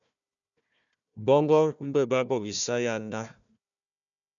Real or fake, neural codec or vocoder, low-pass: fake; codec, 16 kHz, 1 kbps, FunCodec, trained on Chinese and English, 50 frames a second; 7.2 kHz